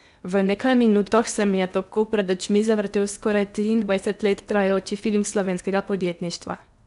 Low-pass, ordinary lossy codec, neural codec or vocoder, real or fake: 10.8 kHz; none; codec, 16 kHz in and 24 kHz out, 0.8 kbps, FocalCodec, streaming, 65536 codes; fake